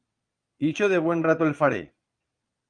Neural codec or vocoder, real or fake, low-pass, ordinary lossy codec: vocoder, 22.05 kHz, 80 mel bands, Vocos; fake; 9.9 kHz; Opus, 32 kbps